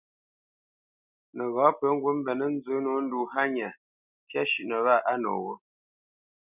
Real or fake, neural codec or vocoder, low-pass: real; none; 3.6 kHz